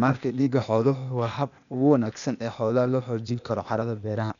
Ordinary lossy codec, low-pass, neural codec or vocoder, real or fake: none; 7.2 kHz; codec, 16 kHz, 0.8 kbps, ZipCodec; fake